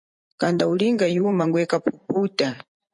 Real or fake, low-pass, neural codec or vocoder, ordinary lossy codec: fake; 10.8 kHz; vocoder, 44.1 kHz, 128 mel bands every 512 samples, BigVGAN v2; MP3, 48 kbps